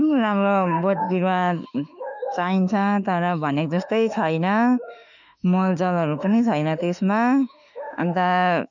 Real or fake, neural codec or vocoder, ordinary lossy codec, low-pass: fake; autoencoder, 48 kHz, 32 numbers a frame, DAC-VAE, trained on Japanese speech; none; 7.2 kHz